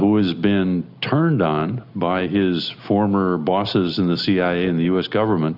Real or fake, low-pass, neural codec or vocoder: real; 5.4 kHz; none